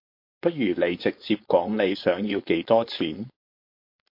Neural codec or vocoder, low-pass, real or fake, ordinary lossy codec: codec, 16 kHz, 4.8 kbps, FACodec; 5.4 kHz; fake; MP3, 32 kbps